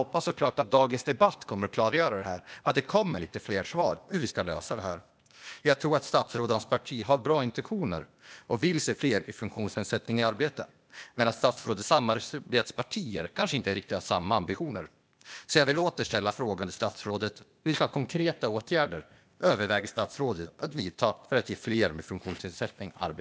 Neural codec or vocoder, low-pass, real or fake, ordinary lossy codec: codec, 16 kHz, 0.8 kbps, ZipCodec; none; fake; none